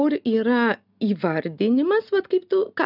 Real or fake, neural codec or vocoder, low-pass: real; none; 5.4 kHz